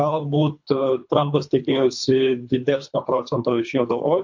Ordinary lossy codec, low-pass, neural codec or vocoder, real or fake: MP3, 48 kbps; 7.2 kHz; codec, 24 kHz, 3 kbps, HILCodec; fake